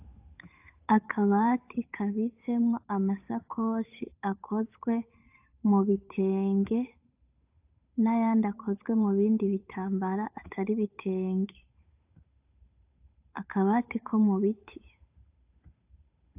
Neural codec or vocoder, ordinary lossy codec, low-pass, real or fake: codec, 16 kHz, 8 kbps, FunCodec, trained on Chinese and English, 25 frames a second; AAC, 32 kbps; 3.6 kHz; fake